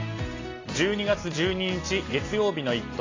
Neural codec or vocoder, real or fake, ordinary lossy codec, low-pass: none; real; AAC, 32 kbps; 7.2 kHz